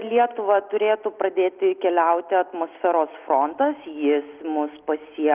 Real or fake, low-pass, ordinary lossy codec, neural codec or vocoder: real; 3.6 kHz; Opus, 24 kbps; none